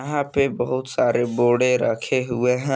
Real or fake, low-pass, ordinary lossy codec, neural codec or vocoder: real; none; none; none